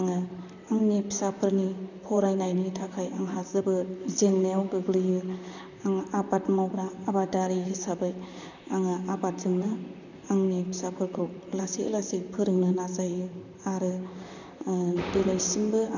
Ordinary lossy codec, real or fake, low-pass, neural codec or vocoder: none; fake; 7.2 kHz; vocoder, 44.1 kHz, 128 mel bands, Pupu-Vocoder